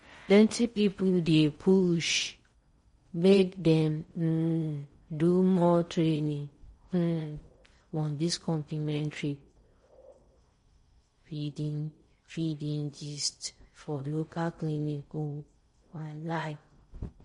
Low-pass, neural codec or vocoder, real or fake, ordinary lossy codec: 10.8 kHz; codec, 16 kHz in and 24 kHz out, 0.6 kbps, FocalCodec, streaming, 4096 codes; fake; MP3, 48 kbps